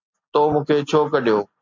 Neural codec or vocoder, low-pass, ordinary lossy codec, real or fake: none; 7.2 kHz; MP3, 64 kbps; real